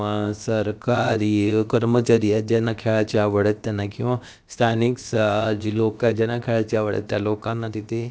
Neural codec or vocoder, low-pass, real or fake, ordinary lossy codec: codec, 16 kHz, about 1 kbps, DyCAST, with the encoder's durations; none; fake; none